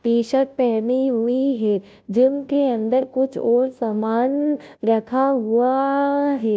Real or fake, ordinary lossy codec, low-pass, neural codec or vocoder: fake; none; none; codec, 16 kHz, 0.5 kbps, FunCodec, trained on Chinese and English, 25 frames a second